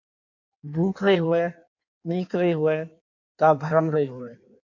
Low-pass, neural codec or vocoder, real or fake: 7.2 kHz; codec, 16 kHz in and 24 kHz out, 1.1 kbps, FireRedTTS-2 codec; fake